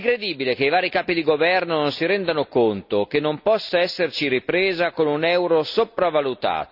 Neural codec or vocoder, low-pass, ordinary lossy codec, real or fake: none; 5.4 kHz; none; real